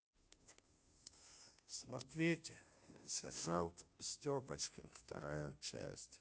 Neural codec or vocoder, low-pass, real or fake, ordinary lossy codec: codec, 16 kHz, 0.5 kbps, FunCodec, trained on Chinese and English, 25 frames a second; none; fake; none